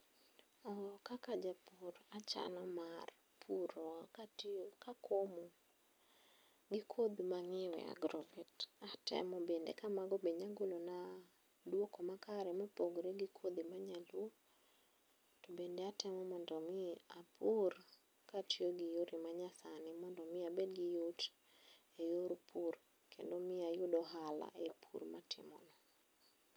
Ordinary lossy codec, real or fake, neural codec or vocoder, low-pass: none; real; none; none